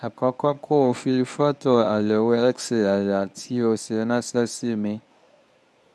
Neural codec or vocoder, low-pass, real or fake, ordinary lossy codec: codec, 24 kHz, 0.9 kbps, WavTokenizer, medium speech release version 1; none; fake; none